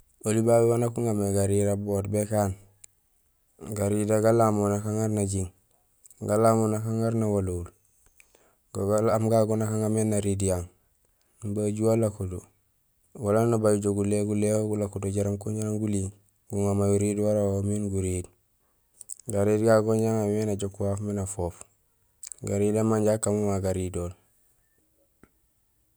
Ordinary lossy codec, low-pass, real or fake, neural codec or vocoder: none; none; real; none